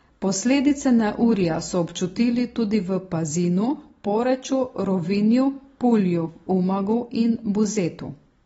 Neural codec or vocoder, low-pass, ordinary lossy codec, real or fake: none; 19.8 kHz; AAC, 24 kbps; real